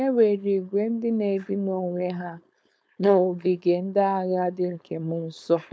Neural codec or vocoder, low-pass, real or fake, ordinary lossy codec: codec, 16 kHz, 4.8 kbps, FACodec; none; fake; none